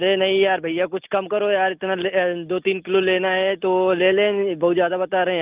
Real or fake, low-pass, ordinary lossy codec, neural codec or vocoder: real; 3.6 kHz; Opus, 24 kbps; none